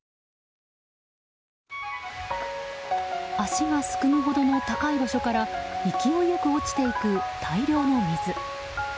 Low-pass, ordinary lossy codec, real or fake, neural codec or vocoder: none; none; real; none